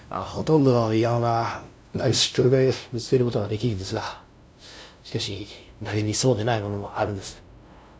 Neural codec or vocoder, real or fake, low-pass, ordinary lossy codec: codec, 16 kHz, 0.5 kbps, FunCodec, trained on LibriTTS, 25 frames a second; fake; none; none